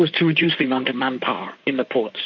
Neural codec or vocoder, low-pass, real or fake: codec, 16 kHz in and 24 kHz out, 2.2 kbps, FireRedTTS-2 codec; 7.2 kHz; fake